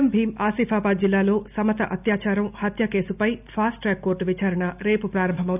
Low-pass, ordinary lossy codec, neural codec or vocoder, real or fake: 3.6 kHz; none; none; real